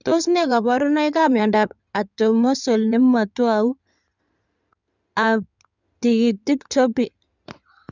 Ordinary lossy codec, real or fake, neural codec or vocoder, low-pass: none; fake; codec, 16 kHz in and 24 kHz out, 2.2 kbps, FireRedTTS-2 codec; 7.2 kHz